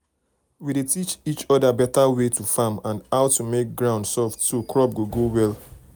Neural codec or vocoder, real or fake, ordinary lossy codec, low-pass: none; real; none; none